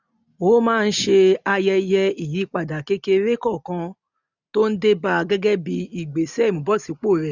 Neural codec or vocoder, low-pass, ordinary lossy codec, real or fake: none; 7.2 kHz; none; real